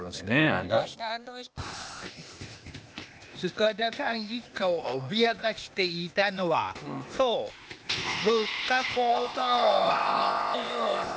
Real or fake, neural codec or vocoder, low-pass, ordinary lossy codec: fake; codec, 16 kHz, 0.8 kbps, ZipCodec; none; none